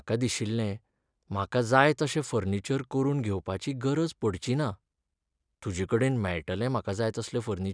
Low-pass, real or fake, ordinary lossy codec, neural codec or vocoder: 9.9 kHz; real; none; none